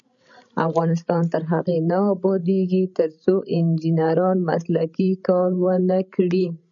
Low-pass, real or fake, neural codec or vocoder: 7.2 kHz; fake; codec, 16 kHz, 16 kbps, FreqCodec, larger model